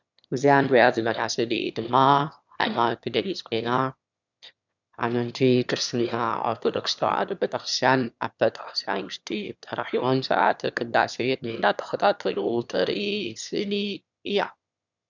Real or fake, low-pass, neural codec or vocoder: fake; 7.2 kHz; autoencoder, 22.05 kHz, a latent of 192 numbers a frame, VITS, trained on one speaker